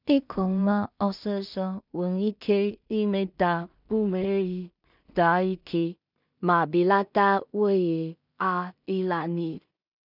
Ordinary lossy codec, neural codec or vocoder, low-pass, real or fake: none; codec, 16 kHz in and 24 kHz out, 0.4 kbps, LongCat-Audio-Codec, two codebook decoder; 5.4 kHz; fake